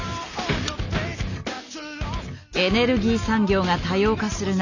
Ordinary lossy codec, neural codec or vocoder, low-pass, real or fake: none; none; 7.2 kHz; real